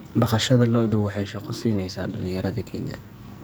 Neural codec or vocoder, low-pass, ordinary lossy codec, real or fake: codec, 44.1 kHz, 2.6 kbps, SNAC; none; none; fake